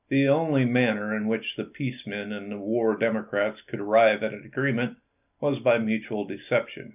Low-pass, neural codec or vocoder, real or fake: 3.6 kHz; none; real